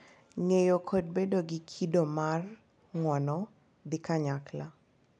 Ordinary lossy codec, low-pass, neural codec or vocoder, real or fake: none; 9.9 kHz; none; real